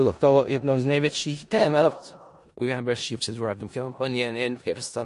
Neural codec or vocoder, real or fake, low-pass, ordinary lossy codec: codec, 16 kHz in and 24 kHz out, 0.4 kbps, LongCat-Audio-Codec, four codebook decoder; fake; 10.8 kHz; MP3, 48 kbps